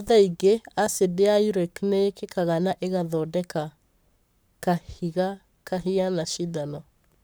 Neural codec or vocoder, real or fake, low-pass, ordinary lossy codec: codec, 44.1 kHz, 7.8 kbps, Pupu-Codec; fake; none; none